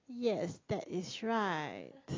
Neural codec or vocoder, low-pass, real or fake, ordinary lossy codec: none; 7.2 kHz; real; AAC, 32 kbps